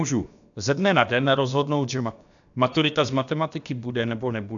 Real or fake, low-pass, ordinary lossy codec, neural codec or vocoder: fake; 7.2 kHz; MP3, 96 kbps; codec, 16 kHz, about 1 kbps, DyCAST, with the encoder's durations